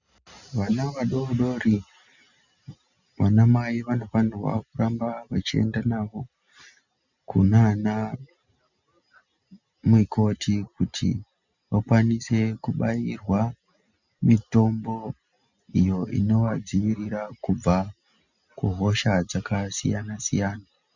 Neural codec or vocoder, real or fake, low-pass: none; real; 7.2 kHz